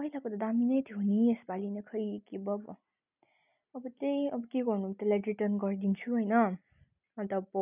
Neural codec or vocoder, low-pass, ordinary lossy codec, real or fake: none; 3.6 kHz; none; real